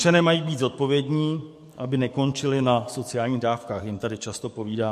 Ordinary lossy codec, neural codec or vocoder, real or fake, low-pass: MP3, 64 kbps; codec, 44.1 kHz, 7.8 kbps, DAC; fake; 14.4 kHz